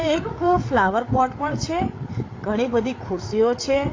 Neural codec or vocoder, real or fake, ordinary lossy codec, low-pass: codec, 16 kHz, 8 kbps, FreqCodec, larger model; fake; AAC, 32 kbps; 7.2 kHz